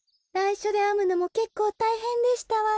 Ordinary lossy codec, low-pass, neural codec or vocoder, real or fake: none; none; none; real